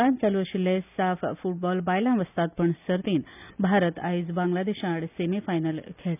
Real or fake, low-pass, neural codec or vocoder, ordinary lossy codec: real; 3.6 kHz; none; none